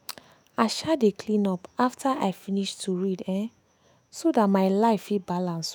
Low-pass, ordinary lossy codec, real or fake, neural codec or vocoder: none; none; fake; autoencoder, 48 kHz, 128 numbers a frame, DAC-VAE, trained on Japanese speech